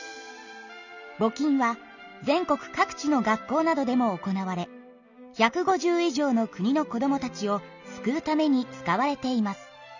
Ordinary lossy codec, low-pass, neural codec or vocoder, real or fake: none; 7.2 kHz; none; real